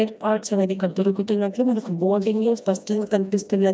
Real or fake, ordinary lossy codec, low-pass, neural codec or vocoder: fake; none; none; codec, 16 kHz, 1 kbps, FreqCodec, smaller model